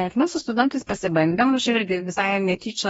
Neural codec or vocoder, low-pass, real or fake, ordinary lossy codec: codec, 44.1 kHz, 2.6 kbps, DAC; 19.8 kHz; fake; AAC, 24 kbps